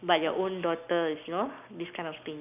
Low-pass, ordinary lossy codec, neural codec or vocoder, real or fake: 3.6 kHz; none; none; real